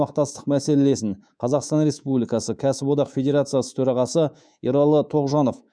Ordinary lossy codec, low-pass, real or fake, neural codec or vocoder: none; 9.9 kHz; fake; codec, 24 kHz, 3.1 kbps, DualCodec